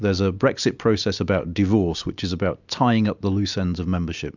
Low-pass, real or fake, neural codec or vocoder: 7.2 kHz; real; none